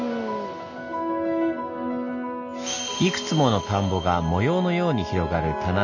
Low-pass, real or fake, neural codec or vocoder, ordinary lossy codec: 7.2 kHz; real; none; none